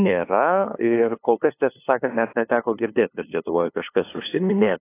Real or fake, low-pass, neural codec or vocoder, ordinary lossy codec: fake; 3.6 kHz; codec, 16 kHz, 2 kbps, FunCodec, trained on LibriTTS, 25 frames a second; AAC, 24 kbps